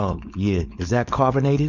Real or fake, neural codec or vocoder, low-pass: fake; codec, 16 kHz, 4.8 kbps, FACodec; 7.2 kHz